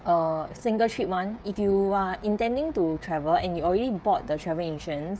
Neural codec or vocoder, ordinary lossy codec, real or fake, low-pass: codec, 16 kHz, 16 kbps, FreqCodec, smaller model; none; fake; none